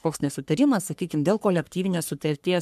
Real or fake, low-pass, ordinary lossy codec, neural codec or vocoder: fake; 14.4 kHz; MP3, 96 kbps; codec, 44.1 kHz, 3.4 kbps, Pupu-Codec